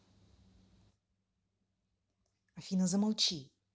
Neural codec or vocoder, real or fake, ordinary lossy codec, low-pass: none; real; none; none